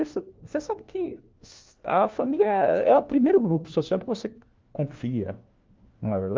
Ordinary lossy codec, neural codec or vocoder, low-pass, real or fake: Opus, 32 kbps; codec, 16 kHz, 1 kbps, FunCodec, trained on LibriTTS, 50 frames a second; 7.2 kHz; fake